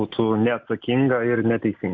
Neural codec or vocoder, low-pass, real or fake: none; 7.2 kHz; real